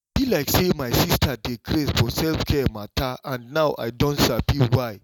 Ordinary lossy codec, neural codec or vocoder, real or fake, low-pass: none; none; real; 19.8 kHz